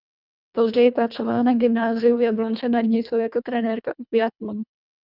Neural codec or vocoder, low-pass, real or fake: codec, 24 kHz, 1.5 kbps, HILCodec; 5.4 kHz; fake